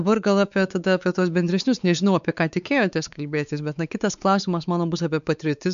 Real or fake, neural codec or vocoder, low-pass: fake; codec, 16 kHz, 4 kbps, X-Codec, WavLM features, trained on Multilingual LibriSpeech; 7.2 kHz